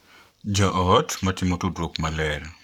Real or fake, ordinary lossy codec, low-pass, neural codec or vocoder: fake; none; 19.8 kHz; codec, 44.1 kHz, 7.8 kbps, Pupu-Codec